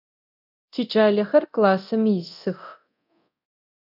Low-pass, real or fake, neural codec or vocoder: 5.4 kHz; fake; codec, 24 kHz, 0.9 kbps, DualCodec